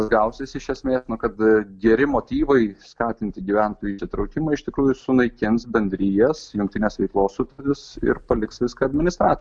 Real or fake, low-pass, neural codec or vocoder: real; 9.9 kHz; none